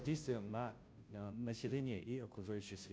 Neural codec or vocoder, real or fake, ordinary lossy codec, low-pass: codec, 16 kHz, 0.5 kbps, FunCodec, trained on Chinese and English, 25 frames a second; fake; none; none